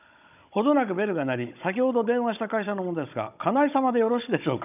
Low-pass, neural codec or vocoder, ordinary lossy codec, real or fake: 3.6 kHz; codec, 16 kHz, 16 kbps, FunCodec, trained on Chinese and English, 50 frames a second; none; fake